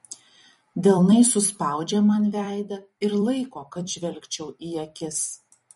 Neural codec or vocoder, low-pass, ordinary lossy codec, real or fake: vocoder, 44.1 kHz, 128 mel bands every 256 samples, BigVGAN v2; 19.8 kHz; MP3, 48 kbps; fake